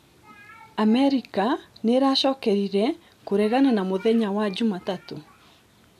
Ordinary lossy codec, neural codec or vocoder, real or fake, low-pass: AAC, 96 kbps; none; real; 14.4 kHz